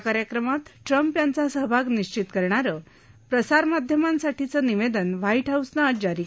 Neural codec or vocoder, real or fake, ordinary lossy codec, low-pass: none; real; none; none